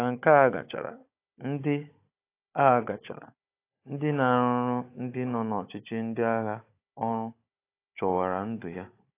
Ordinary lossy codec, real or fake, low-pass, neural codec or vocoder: AAC, 24 kbps; fake; 3.6 kHz; codec, 16 kHz, 16 kbps, FunCodec, trained on Chinese and English, 50 frames a second